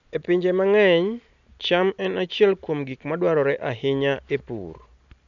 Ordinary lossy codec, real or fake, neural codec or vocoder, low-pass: none; real; none; 7.2 kHz